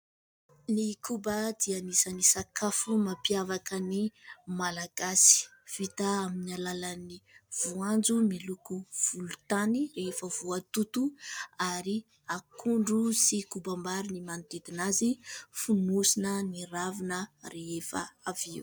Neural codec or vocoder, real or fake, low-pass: none; real; 19.8 kHz